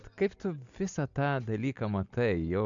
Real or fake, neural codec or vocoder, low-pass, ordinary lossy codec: real; none; 7.2 kHz; MP3, 64 kbps